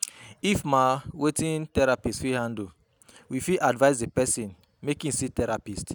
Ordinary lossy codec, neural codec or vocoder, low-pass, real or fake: none; none; none; real